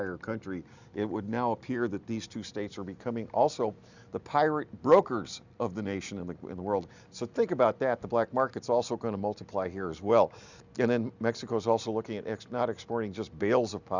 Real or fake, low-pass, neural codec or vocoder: real; 7.2 kHz; none